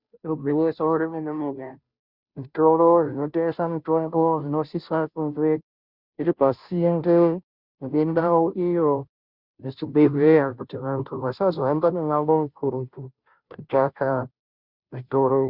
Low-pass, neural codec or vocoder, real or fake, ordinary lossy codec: 5.4 kHz; codec, 16 kHz, 0.5 kbps, FunCodec, trained on Chinese and English, 25 frames a second; fake; Opus, 64 kbps